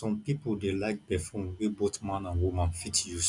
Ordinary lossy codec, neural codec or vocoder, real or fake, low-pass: none; none; real; 10.8 kHz